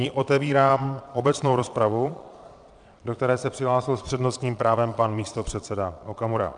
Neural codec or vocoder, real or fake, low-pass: vocoder, 22.05 kHz, 80 mel bands, Vocos; fake; 9.9 kHz